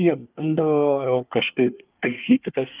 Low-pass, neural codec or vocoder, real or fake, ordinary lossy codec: 3.6 kHz; codec, 24 kHz, 1 kbps, SNAC; fake; Opus, 32 kbps